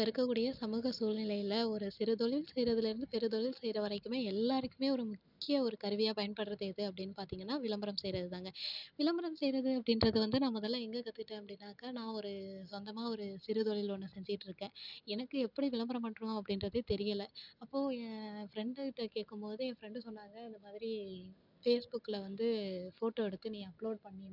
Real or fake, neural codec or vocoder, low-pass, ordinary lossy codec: real; none; 5.4 kHz; none